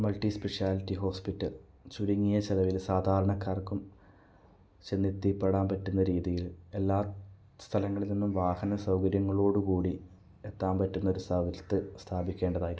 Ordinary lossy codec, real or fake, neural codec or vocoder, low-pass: none; real; none; none